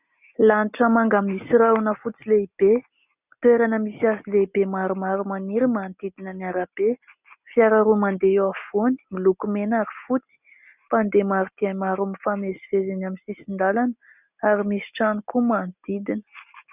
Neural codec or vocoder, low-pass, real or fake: none; 3.6 kHz; real